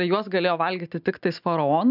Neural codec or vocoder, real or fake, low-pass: none; real; 5.4 kHz